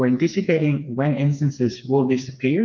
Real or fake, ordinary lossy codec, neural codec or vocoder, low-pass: fake; MP3, 64 kbps; codec, 16 kHz, 2 kbps, FreqCodec, smaller model; 7.2 kHz